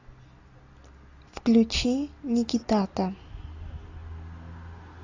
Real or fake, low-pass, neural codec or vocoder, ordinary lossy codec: real; 7.2 kHz; none; AAC, 48 kbps